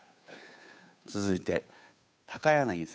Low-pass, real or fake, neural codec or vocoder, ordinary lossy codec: none; fake; codec, 16 kHz, 2 kbps, FunCodec, trained on Chinese and English, 25 frames a second; none